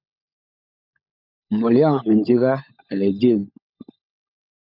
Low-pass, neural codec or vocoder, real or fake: 5.4 kHz; codec, 16 kHz, 16 kbps, FunCodec, trained on LibriTTS, 50 frames a second; fake